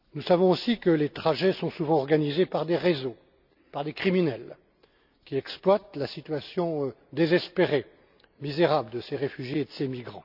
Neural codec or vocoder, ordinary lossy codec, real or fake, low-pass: none; none; real; 5.4 kHz